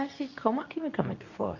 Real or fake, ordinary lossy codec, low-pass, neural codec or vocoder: fake; none; 7.2 kHz; codec, 24 kHz, 0.9 kbps, WavTokenizer, medium speech release version 2